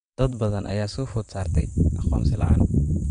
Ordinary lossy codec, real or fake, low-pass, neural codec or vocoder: MP3, 64 kbps; fake; 9.9 kHz; vocoder, 22.05 kHz, 80 mel bands, WaveNeXt